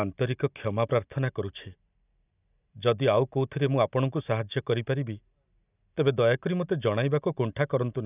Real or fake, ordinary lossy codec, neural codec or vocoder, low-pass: real; none; none; 3.6 kHz